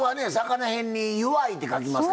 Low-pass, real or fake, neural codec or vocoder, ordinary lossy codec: none; real; none; none